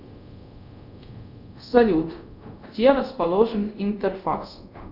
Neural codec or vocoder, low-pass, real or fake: codec, 24 kHz, 0.5 kbps, DualCodec; 5.4 kHz; fake